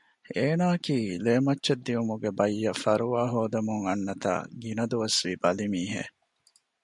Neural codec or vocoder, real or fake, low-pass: none; real; 10.8 kHz